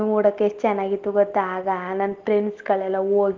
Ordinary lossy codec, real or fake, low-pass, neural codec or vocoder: Opus, 16 kbps; real; 7.2 kHz; none